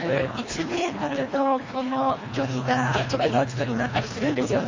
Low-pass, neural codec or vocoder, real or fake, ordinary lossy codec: 7.2 kHz; codec, 24 kHz, 1.5 kbps, HILCodec; fake; MP3, 32 kbps